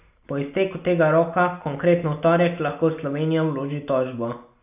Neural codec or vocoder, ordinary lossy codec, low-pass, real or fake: none; none; 3.6 kHz; real